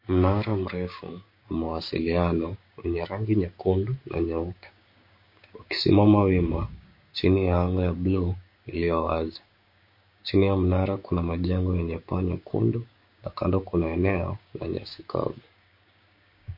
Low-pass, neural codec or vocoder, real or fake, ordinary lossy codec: 5.4 kHz; codec, 16 kHz, 6 kbps, DAC; fake; MP3, 32 kbps